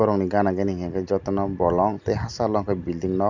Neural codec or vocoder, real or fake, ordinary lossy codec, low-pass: none; real; none; 7.2 kHz